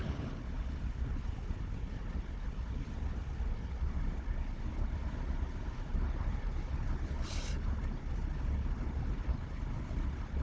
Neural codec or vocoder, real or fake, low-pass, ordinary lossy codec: codec, 16 kHz, 4 kbps, FunCodec, trained on Chinese and English, 50 frames a second; fake; none; none